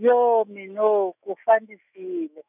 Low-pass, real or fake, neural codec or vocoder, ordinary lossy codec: 3.6 kHz; fake; autoencoder, 48 kHz, 128 numbers a frame, DAC-VAE, trained on Japanese speech; none